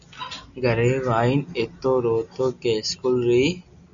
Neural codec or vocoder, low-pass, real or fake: none; 7.2 kHz; real